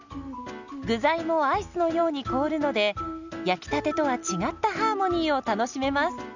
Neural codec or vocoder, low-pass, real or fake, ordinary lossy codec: none; 7.2 kHz; real; none